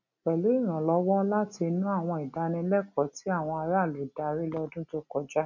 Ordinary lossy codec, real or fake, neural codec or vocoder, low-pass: none; real; none; 7.2 kHz